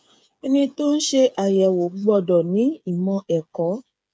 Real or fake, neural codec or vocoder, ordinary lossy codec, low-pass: fake; codec, 16 kHz, 8 kbps, FreqCodec, smaller model; none; none